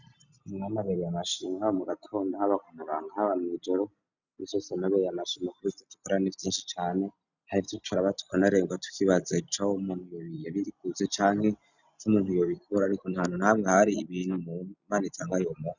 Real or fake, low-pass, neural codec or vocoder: real; 7.2 kHz; none